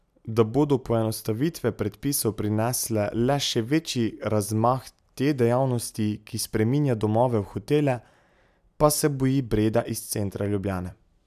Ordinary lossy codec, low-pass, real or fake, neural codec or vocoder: none; 14.4 kHz; real; none